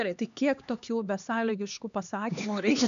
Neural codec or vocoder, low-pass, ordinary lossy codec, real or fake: codec, 16 kHz, 2 kbps, X-Codec, HuBERT features, trained on LibriSpeech; 7.2 kHz; AAC, 96 kbps; fake